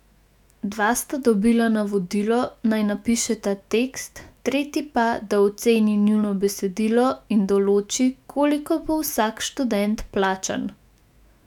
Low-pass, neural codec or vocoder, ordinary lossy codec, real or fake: 19.8 kHz; autoencoder, 48 kHz, 128 numbers a frame, DAC-VAE, trained on Japanese speech; none; fake